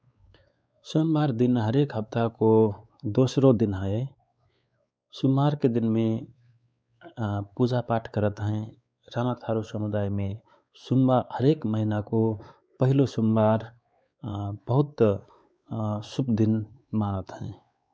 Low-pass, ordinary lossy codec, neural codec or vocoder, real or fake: none; none; codec, 16 kHz, 4 kbps, X-Codec, WavLM features, trained on Multilingual LibriSpeech; fake